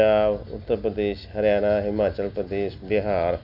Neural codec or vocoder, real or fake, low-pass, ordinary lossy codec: none; real; 5.4 kHz; none